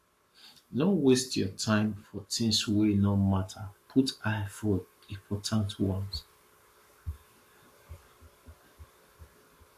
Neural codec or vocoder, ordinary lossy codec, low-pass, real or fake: codec, 44.1 kHz, 7.8 kbps, Pupu-Codec; AAC, 64 kbps; 14.4 kHz; fake